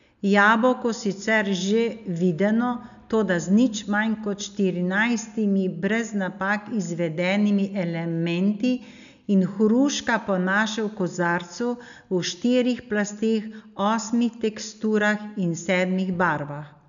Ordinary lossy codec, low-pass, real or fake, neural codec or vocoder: none; 7.2 kHz; real; none